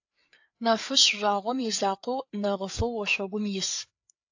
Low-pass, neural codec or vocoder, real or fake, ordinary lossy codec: 7.2 kHz; codec, 16 kHz, 4 kbps, FreqCodec, larger model; fake; AAC, 48 kbps